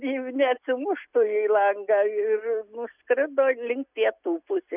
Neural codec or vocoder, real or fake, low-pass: none; real; 3.6 kHz